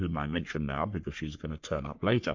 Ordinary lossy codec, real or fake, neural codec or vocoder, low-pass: MP3, 64 kbps; fake; codec, 44.1 kHz, 3.4 kbps, Pupu-Codec; 7.2 kHz